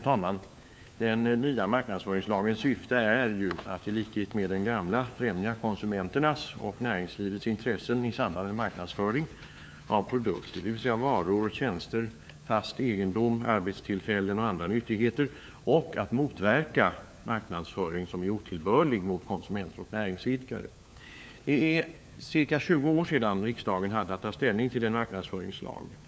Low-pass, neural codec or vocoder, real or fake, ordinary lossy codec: none; codec, 16 kHz, 4 kbps, FunCodec, trained on LibriTTS, 50 frames a second; fake; none